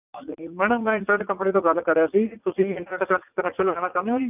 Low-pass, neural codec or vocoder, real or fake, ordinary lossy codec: 3.6 kHz; vocoder, 44.1 kHz, 80 mel bands, Vocos; fake; none